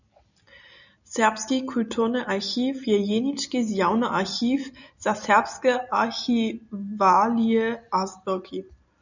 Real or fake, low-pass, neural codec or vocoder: real; 7.2 kHz; none